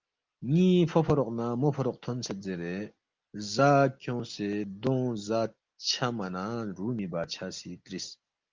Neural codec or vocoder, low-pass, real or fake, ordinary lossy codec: none; 7.2 kHz; real; Opus, 24 kbps